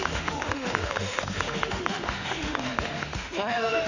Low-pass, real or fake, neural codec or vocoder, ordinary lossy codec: 7.2 kHz; fake; autoencoder, 48 kHz, 32 numbers a frame, DAC-VAE, trained on Japanese speech; none